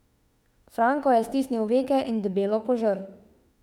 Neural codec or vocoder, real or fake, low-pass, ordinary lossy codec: autoencoder, 48 kHz, 32 numbers a frame, DAC-VAE, trained on Japanese speech; fake; 19.8 kHz; none